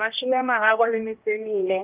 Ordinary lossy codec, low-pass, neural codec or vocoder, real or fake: Opus, 32 kbps; 3.6 kHz; codec, 16 kHz, 1 kbps, X-Codec, HuBERT features, trained on general audio; fake